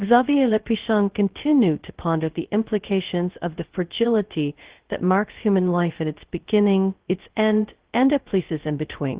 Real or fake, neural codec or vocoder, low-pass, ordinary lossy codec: fake; codec, 16 kHz, 0.2 kbps, FocalCodec; 3.6 kHz; Opus, 16 kbps